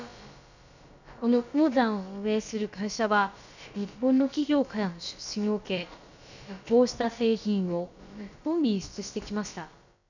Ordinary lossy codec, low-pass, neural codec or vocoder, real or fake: none; 7.2 kHz; codec, 16 kHz, about 1 kbps, DyCAST, with the encoder's durations; fake